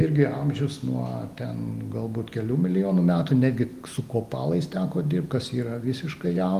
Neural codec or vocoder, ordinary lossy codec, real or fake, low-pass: none; Opus, 24 kbps; real; 14.4 kHz